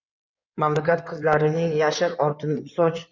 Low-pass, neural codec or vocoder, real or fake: 7.2 kHz; codec, 16 kHz in and 24 kHz out, 2.2 kbps, FireRedTTS-2 codec; fake